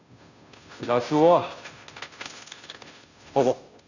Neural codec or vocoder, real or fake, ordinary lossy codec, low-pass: codec, 16 kHz, 0.5 kbps, FunCodec, trained on Chinese and English, 25 frames a second; fake; none; 7.2 kHz